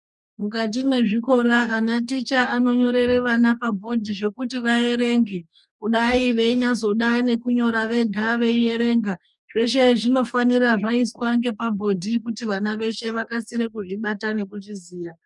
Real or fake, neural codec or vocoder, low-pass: fake; codec, 44.1 kHz, 2.6 kbps, DAC; 10.8 kHz